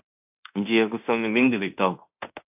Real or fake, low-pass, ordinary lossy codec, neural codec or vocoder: fake; 3.6 kHz; AAC, 32 kbps; codec, 16 kHz in and 24 kHz out, 0.9 kbps, LongCat-Audio-Codec, fine tuned four codebook decoder